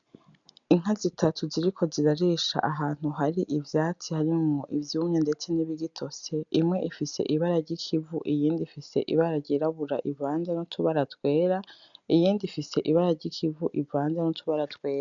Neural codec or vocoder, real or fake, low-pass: none; real; 7.2 kHz